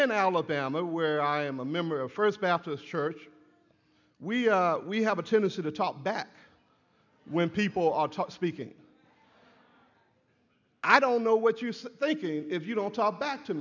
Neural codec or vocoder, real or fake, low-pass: none; real; 7.2 kHz